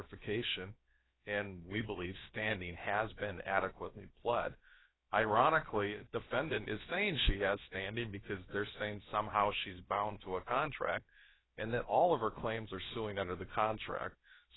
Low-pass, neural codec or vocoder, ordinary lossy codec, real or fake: 7.2 kHz; codec, 16 kHz, about 1 kbps, DyCAST, with the encoder's durations; AAC, 16 kbps; fake